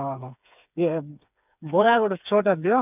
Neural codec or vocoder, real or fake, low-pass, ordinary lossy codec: codec, 16 kHz, 4 kbps, FreqCodec, smaller model; fake; 3.6 kHz; none